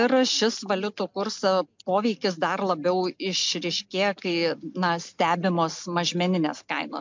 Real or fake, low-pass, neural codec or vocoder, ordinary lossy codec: real; 7.2 kHz; none; MP3, 64 kbps